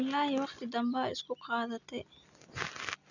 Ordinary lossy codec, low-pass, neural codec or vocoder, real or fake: none; 7.2 kHz; none; real